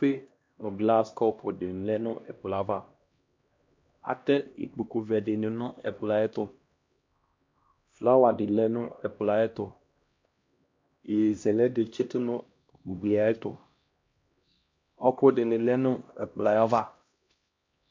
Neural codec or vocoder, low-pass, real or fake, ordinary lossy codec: codec, 16 kHz, 1 kbps, X-Codec, HuBERT features, trained on LibriSpeech; 7.2 kHz; fake; MP3, 48 kbps